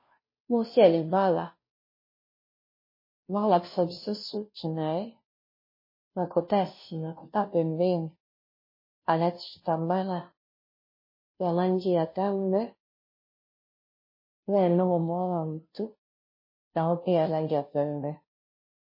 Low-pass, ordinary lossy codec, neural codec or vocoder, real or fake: 5.4 kHz; MP3, 24 kbps; codec, 16 kHz, 0.5 kbps, FunCodec, trained on Chinese and English, 25 frames a second; fake